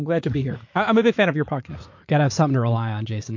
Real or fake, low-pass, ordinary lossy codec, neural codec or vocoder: fake; 7.2 kHz; MP3, 48 kbps; vocoder, 44.1 kHz, 80 mel bands, Vocos